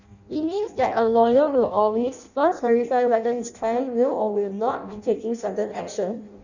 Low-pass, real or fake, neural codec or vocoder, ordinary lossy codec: 7.2 kHz; fake; codec, 16 kHz in and 24 kHz out, 0.6 kbps, FireRedTTS-2 codec; none